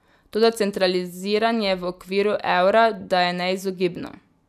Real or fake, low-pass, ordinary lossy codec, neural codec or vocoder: real; 14.4 kHz; none; none